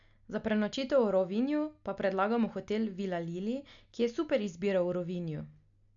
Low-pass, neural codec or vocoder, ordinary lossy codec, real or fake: 7.2 kHz; none; none; real